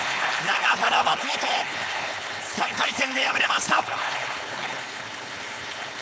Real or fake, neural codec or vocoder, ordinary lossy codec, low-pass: fake; codec, 16 kHz, 4.8 kbps, FACodec; none; none